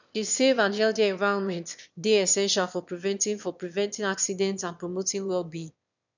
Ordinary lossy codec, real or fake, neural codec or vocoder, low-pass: none; fake; autoencoder, 22.05 kHz, a latent of 192 numbers a frame, VITS, trained on one speaker; 7.2 kHz